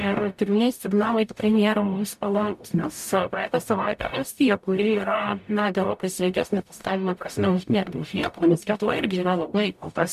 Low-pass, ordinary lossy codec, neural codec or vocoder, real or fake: 14.4 kHz; MP3, 96 kbps; codec, 44.1 kHz, 0.9 kbps, DAC; fake